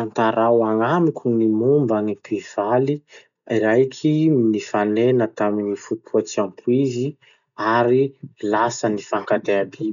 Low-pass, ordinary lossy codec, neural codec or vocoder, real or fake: 7.2 kHz; none; none; real